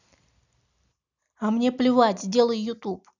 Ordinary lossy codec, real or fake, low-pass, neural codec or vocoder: none; real; 7.2 kHz; none